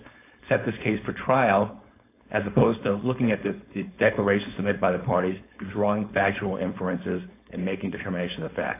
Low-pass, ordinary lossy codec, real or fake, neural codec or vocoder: 3.6 kHz; AAC, 24 kbps; fake; codec, 16 kHz, 4.8 kbps, FACodec